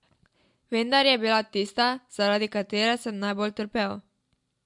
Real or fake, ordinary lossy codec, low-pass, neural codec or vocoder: real; MP3, 64 kbps; 10.8 kHz; none